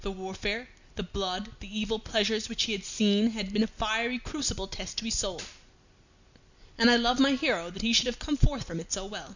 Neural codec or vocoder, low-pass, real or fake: none; 7.2 kHz; real